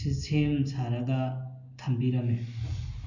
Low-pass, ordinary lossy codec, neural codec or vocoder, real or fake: 7.2 kHz; none; none; real